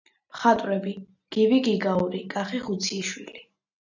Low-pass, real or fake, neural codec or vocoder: 7.2 kHz; real; none